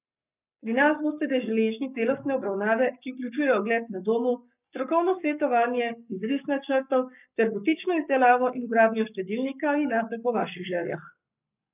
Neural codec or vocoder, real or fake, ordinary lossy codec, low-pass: codec, 44.1 kHz, 7.8 kbps, Pupu-Codec; fake; none; 3.6 kHz